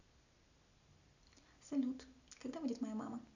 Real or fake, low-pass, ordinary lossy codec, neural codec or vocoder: real; 7.2 kHz; none; none